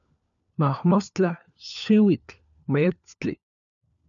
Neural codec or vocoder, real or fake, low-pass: codec, 16 kHz, 4 kbps, FunCodec, trained on LibriTTS, 50 frames a second; fake; 7.2 kHz